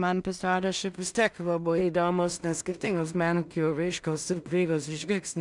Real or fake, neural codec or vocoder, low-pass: fake; codec, 16 kHz in and 24 kHz out, 0.4 kbps, LongCat-Audio-Codec, two codebook decoder; 10.8 kHz